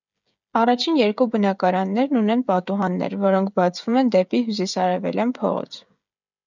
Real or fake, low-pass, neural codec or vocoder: fake; 7.2 kHz; codec, 16 kHz, 16 kbps, FreqCodec, smaller model